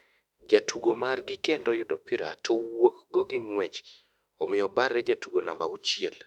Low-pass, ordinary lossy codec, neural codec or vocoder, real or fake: 19.8 kHz; none; autoencoder, 48 kHz, 32 numbers a frame, DAC-VAE, trained on Japanese speech; fake